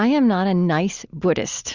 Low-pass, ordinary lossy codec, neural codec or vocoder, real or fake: 7.2 kHz; Opus, 64 kbps; none; real